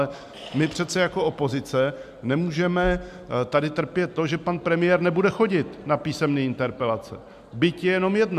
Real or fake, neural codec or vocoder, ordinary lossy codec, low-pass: real; none; MP3, 96 kbps; 14.4 kHz